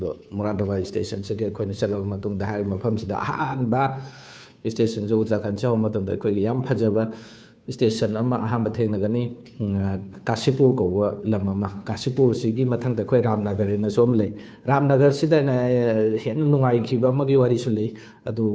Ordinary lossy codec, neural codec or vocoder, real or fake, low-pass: none; codec, 16 kHz, 2 kbps, FunCodec, trained on Chinese and English, 25 frames a second; fake; none